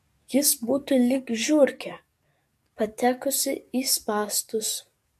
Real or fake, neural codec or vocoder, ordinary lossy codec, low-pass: fake; codec, 44.1 kHz, 7.8 kbps, DAC; MP3, 64 kbps; 14.4 kHz